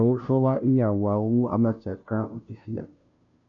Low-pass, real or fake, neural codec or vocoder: 7.2 kHz; fake; codec, 16 kHz, 0.5 kbps, FunCodec, trained on Chinese and English, 25 frames a second